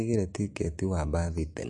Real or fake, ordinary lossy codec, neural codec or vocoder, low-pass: real; MP3, 48 kbps; none; 10.8 kHz